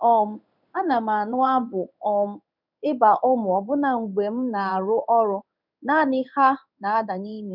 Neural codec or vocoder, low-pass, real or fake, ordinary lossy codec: codec, 16 kHz in and 24 kHz out, 1 kbps, XY-Tokenizer; 5.4 kHz; fake; none